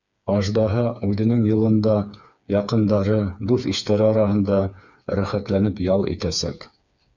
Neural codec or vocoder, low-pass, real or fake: codec, 16 kHz, 4 kbps, FreqCodec, smaller model; 7.2 kHz; fake